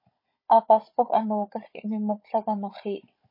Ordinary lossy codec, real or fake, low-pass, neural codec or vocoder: MP3, 24 kbps; fake; 5.4 kHz; codec, 16 kHz, 16 kbps, FunCodec, trained on Chinese and English, 50 frames a second